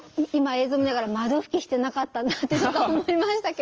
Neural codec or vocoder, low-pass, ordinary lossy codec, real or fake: none; 7.2 kHz; Opus, 24 kbps; real